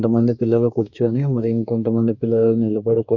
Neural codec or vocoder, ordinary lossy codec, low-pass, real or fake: codec, 44.1 kHz, 2.6 kbps, DAC; none; 7.2 kHz; fake